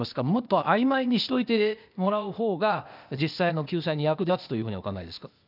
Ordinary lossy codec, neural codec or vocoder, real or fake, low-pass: none; codec, 16 kHz, 0.8 kbps, ZipCodec; fake; 5.4 kHz